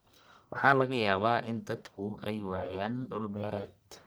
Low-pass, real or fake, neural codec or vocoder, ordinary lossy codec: none; fake; codec, 44.1 kHz, 1.7 kbps, Pupu-Codec; none